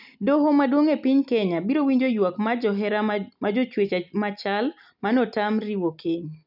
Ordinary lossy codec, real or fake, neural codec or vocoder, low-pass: none; real; none; 5.4 kHz